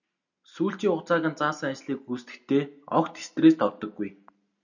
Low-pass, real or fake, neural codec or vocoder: 7.2 kHz; real; none